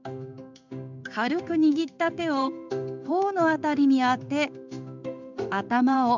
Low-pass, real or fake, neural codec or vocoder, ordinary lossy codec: 7.2 kHz; fake; codec, 16 kHz in and 24 kHz out, 1 kbps, XY-Tokenizer; none